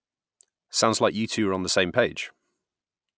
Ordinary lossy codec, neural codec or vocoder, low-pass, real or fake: none; none; none; real